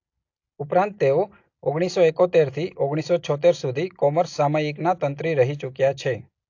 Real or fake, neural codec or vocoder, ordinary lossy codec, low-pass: real; none; AAC, 48 kbps; 7.2 kHz